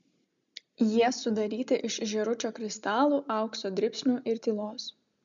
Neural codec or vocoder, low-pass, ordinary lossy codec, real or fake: none; 7.2 kHz; MP3, 64 kbps; real